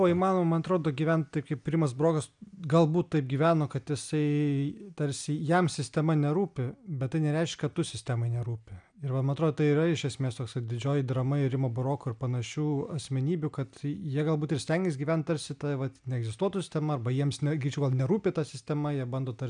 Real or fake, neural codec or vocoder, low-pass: real; none; 9.9 kHz